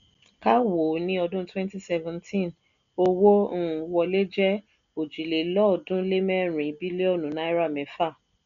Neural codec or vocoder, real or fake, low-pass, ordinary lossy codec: none; real; 7.2 kHz; none